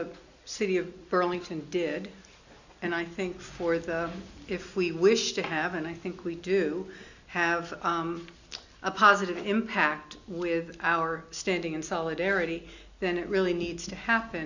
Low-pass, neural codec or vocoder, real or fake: 7.2 kHz; none; real